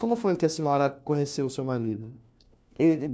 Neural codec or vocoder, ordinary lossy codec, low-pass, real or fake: codec, 16 kHz, 1 kbps, FunCodec, trained on LibriTTS, 50 frames a second; none; none; fake